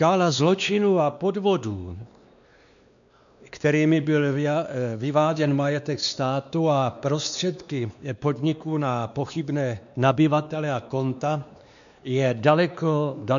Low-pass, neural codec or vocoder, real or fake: 7.2 kHz; codec, 16 kHz, 2 kbps, X-Codec, WavLM features, trained on Multilingual LibriSpeech; fake